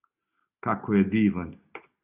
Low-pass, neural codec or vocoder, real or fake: 3.6 kHz; none; real